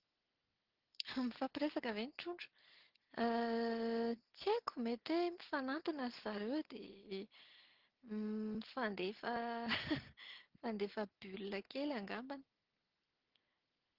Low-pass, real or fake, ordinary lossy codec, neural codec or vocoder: 5.4 kHz; real; Opus, 16 kbps; none